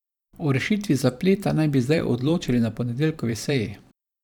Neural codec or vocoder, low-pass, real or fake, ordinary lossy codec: vocoder, 44.1 kHz, 128 mel bands every 512 samples, BigVGAN v2; 19.8 kHz; fake; none